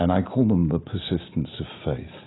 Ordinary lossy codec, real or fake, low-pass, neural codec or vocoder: AAC, 16 kbps; real; 7.2 kHz; none